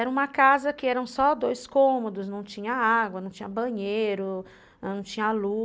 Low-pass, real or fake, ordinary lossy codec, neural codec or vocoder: none; real; none; none